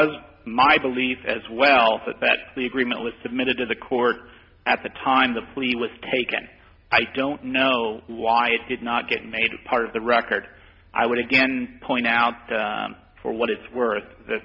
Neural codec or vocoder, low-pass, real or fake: none; 5.4 kHz; real